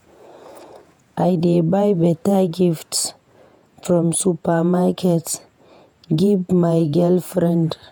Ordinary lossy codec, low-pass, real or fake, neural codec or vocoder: none; none; fake; vocoder, 48 kHz, 128 mel bands, Vocos